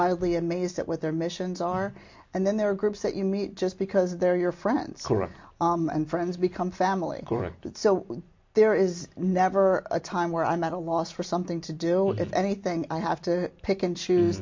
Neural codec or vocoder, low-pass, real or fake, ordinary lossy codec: none; 7.2 kHz; real; MP3, 48 kbps